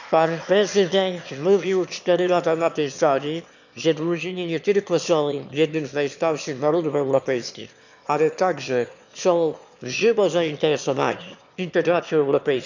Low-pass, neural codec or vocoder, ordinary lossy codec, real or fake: 7.2 kHz; autoencoder, 22.05 kHz, a latent of 192 numbers a frame, VITS, trained on one speaker; none; fake